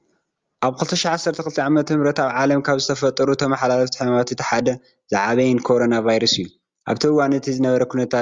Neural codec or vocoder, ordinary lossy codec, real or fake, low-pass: none; Opus, 32 kbps; real; 7.2 kHz